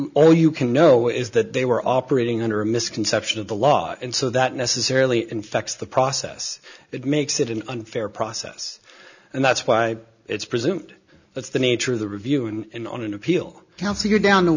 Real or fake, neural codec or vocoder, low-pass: real; none; 7.2 kHz